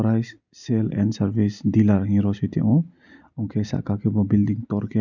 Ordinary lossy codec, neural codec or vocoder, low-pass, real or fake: none; none; 7.2 kHz; real